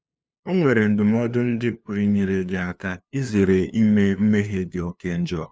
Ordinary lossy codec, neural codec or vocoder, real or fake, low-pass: none; codec, 16 kHz, 2 kbps, FunCodec, trained on LibriTTS, 25 frames a second; fake; none